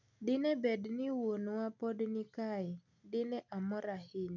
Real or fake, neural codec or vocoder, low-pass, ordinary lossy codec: real; none; 7.2 kHz; none